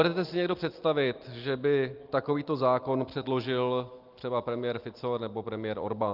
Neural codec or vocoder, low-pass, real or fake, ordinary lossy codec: none; 5.4 kHz; real; Opus, 24 kbps